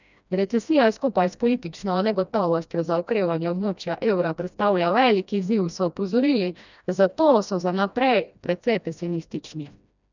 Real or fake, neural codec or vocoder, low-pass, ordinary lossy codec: fake; codec, 16 kHz, 1 kbps, FreqCodec, smaller model; 7.2 kHz; none